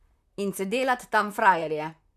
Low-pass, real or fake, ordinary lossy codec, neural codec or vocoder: 14.4 kHz; fake; none; vocoder, 44.1 kHz, 128 mel bands, Pupu-Vocoder